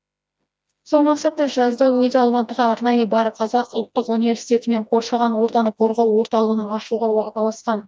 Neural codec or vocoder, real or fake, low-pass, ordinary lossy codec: codec, 16 kHz, 1 kbps, FreqCodec, smaller model; fake; none; none